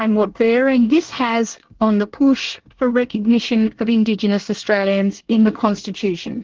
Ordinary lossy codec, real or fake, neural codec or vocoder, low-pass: Opus, 16 kbps; fake; codec, 24 kHz, 1 kbps, SNAC; 7.2 kHz